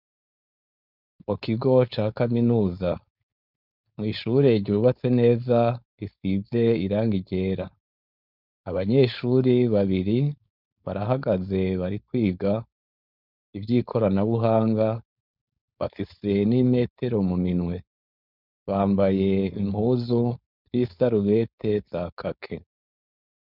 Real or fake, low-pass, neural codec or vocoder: fake; 5.4 kHz; codec, 16 kHz, 4.8 kbps, FACodec